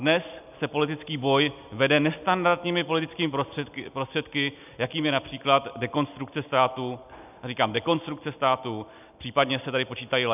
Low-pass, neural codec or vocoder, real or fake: 3.6 kHz; none; real